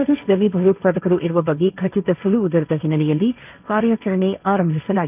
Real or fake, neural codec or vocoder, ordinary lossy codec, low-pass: fake; codec, 16 kHz, 1.1 kbps, Voila-Tokenizer; none; 3.6 kHz